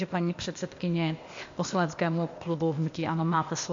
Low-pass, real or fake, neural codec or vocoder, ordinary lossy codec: 7.2 kHz; fake; codec, 16 kHz, 0.8 kbps, ZipCodec; MP3, 48 kbps